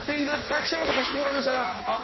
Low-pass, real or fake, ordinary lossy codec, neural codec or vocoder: 7.2 kHz; fake; MP3, 24 kbps; codec, 16 kHz in and 24 kHz out, 0.6 kbps, FireRedTTS-2 codec